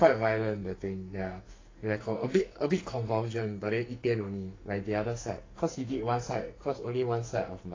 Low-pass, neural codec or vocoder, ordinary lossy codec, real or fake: 7.2 kHz; codec, 44.1 kHz, 2.6 kbps, SNAC; AAC, 32 kbps; fake